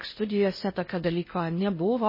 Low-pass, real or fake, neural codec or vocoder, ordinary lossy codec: 5.4 kHz; fake; codec, 16 kHz in and 24 kHz out, 0.6 kbps, FocalCodec, streaming, 4096 codes; MP3, 24 kbps